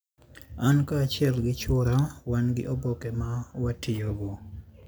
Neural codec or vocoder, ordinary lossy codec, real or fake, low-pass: none; none; real; none